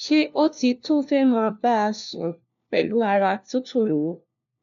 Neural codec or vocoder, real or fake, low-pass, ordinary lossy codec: codec, 16 kHz, 1 kbps, FunCodec, trained on LibriTTS, 50 frames a second; fake; 7.2 kHz; none